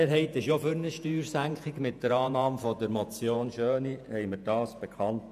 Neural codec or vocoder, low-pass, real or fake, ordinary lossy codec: vocoder, 48 kHz, 128 mel bands, Vocos; 14.4 kHz; fake; none